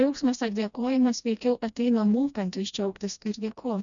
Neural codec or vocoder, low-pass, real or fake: codec, 16 kHz, 1 kbps, FreqCodec, smaller model; 7.2 kHz; fake